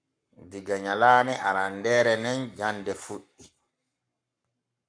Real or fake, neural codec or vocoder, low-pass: fake; codec, 44.1 kHz, 7.8 kbps, Pupu-Codec; 9.9 kHz